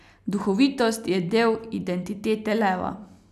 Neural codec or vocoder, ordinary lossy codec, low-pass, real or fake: none; none; 14.4 kHz; real